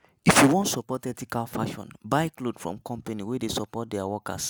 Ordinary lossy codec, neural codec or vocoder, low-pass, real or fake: none; none; none; real